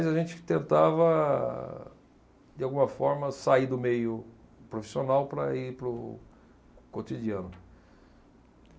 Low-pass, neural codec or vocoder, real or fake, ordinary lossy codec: none; none; real; none